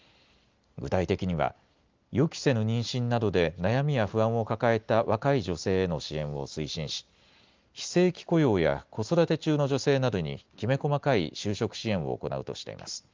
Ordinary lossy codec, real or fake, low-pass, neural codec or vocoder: Opus, 32 kbps; real; 7.2 kHz; none